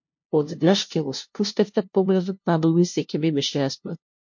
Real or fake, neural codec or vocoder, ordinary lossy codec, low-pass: fake; codec, 16 kHz, 0.5 kbps, FunCodec, trained on LibriTTS, 25 frames a second; MP3, 48 kbps; 7.2 kHz